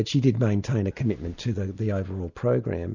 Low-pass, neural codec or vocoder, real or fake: 7.2 kHz; none; real